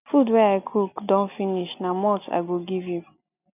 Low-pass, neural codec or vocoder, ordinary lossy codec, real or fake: 3.6 kHz; none; none; real